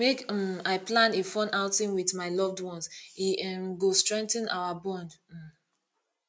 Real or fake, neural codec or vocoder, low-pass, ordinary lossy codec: real; none; none; none